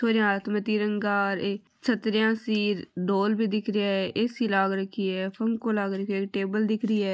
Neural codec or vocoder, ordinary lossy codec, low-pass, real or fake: none; none; none; real